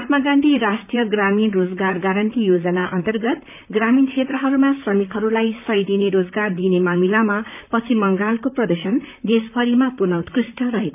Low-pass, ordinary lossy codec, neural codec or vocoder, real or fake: 3.6 kHz; none; vocoder, 44.1 kHz, 128 mel bands, Pupu-Vocoder; fake